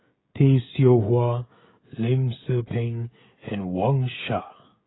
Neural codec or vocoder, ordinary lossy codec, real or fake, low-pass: codec, 16 kHz, 16 kbps, FreqCodec, smaller model; AAC, 16 kbps; fake; 7.2 kHz